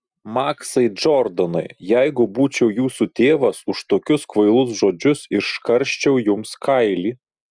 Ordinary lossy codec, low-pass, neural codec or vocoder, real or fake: Opus, 64 kbps; 9.9 kHz; none; real